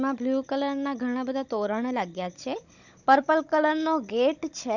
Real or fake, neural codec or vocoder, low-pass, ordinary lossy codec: fake; codec, 16 kHz, 16 kbps, FunCodec, trained on Chinese and English, 50 frames a second; 7.2 kHz; none